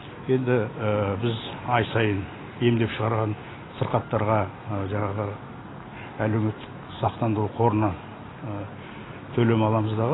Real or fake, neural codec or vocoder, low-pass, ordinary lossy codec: real; none; 7.2 kHz; AAC, 16 kbps